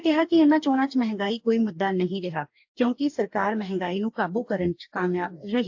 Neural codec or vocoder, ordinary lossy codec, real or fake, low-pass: codec, 44.1 kHz, 2.6 kbps, DAC; AAC, 48 kbps; fake; 7.2 kHz